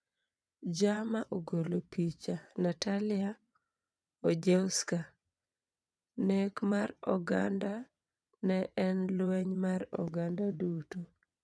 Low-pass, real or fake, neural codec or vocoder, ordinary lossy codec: none; fake; vocoder, 22.05 kHz, 80 mel bands, WaveNeXt; none